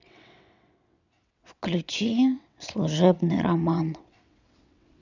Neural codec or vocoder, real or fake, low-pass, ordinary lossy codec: vocoder, 22.05 kHz, 80 mel bands, WaveNeXt; fake; 7.2 kHz; none